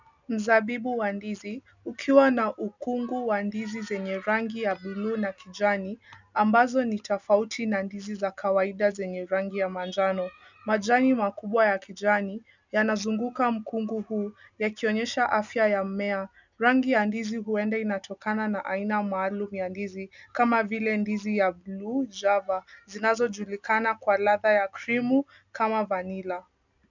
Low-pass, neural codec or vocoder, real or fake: 7.2 kHz; none; real